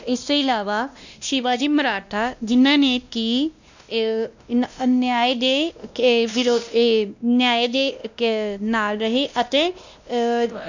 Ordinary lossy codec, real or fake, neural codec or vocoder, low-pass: none; fake; codec, 16 kHz, 1 kbps, X-Codec, WavLM features, trained on Multilingual LibriSpeech; 7.2 kHz